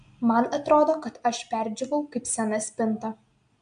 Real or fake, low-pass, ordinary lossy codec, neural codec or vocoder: real; 9.9 kHz; AAC, 64 kbps; none